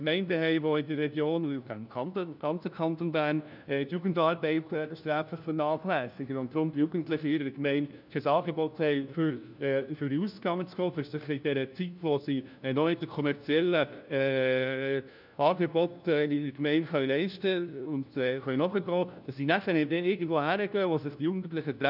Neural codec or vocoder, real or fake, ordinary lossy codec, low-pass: codec, 16 kHz, 1 kbps, FunCodec, trained on LibriTTS, 50 frames a second; fake; none; 5.4 kHz